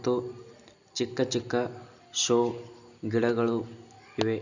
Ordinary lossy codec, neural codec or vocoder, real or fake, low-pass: none; none; real; 7.2 kHz